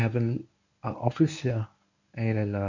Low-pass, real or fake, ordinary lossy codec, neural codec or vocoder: 7.2 kHz; fake; none; codec, 24 kHz, 0.9 kbps, WavTokenizer, medium speech release version 1